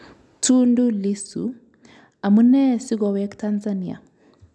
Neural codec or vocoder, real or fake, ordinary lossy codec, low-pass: none; real; none; none